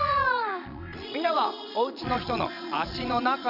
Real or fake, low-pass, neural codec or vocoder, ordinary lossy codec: fake; 5.4 kHz; vocoder, 22.05 kHz, 80 mel bands, Vocos; none